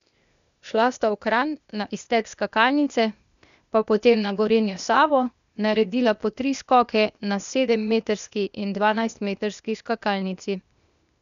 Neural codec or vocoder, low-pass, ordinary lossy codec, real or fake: codec, 16 kHz, 0.8 kbps, ZipCodec; 7.2 kHz; none; fake